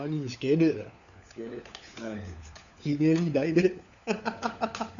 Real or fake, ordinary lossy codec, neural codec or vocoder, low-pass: fake; none; codec, 16 kHz, 4 kbps, FunCodec, trained on LibriTTS, 50 frames a second; 7.2 kHz